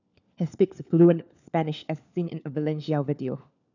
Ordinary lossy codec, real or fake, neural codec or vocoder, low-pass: none; fake; codec, 16 kHz, 4 kbps, FunCodec, trained on LibriTTS, 50 frames a second; 7.2 kHz